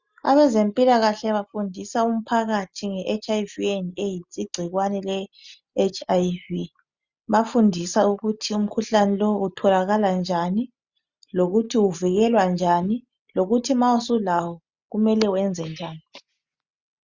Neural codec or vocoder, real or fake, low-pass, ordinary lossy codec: none; real; 7.2 kHz; Opus, 64 kbps